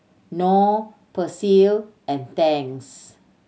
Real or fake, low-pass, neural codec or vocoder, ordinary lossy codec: real; none; none; none